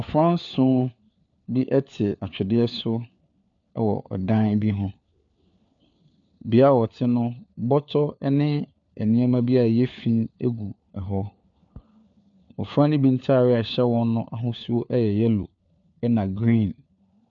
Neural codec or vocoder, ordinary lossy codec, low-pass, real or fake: codec, 16 kHz, 4 kbps, FunCodec, trained on LibriTTS, 50 frames a second; AAC, 64 kbps; 7.2 kHz; fake